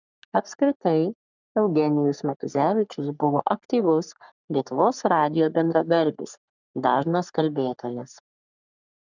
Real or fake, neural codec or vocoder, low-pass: fake; codec, 44.1 kHz, 3.4 kbps, Pupu-Codec; 7.2 kHz